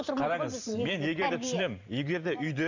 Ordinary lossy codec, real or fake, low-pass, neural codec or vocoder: none; fake; 7.2 kHz; vocoder, 44.1 kHz, 128 mel bands every 512 samples, BigVGAN v2